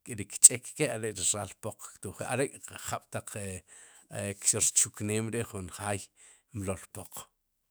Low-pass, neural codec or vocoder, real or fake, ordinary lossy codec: none; autoencoder, 48 kHz, 128 numbers a frame, DAC-VAE, trained on Japanese speech; fake; none